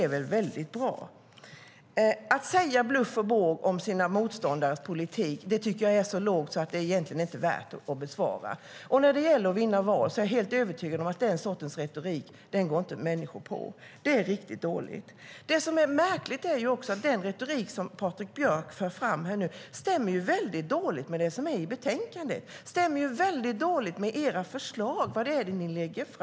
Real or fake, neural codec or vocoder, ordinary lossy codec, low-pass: real; none; none; none